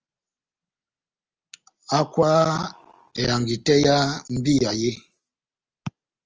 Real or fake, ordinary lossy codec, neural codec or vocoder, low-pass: real; Opus, 24 kbps; none; 7.2 kHz